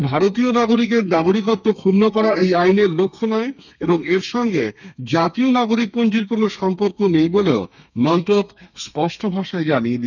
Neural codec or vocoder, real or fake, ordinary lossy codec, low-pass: codec, 32 kHz, 1.9 kbps, SNAC; fake; none; 7.2 kHz